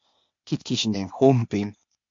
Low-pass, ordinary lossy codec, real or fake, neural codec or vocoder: 7.2 kHz; MP3, 48 kbps; fake; codec, 16 kHz, 0.8 kbps, ZipCodec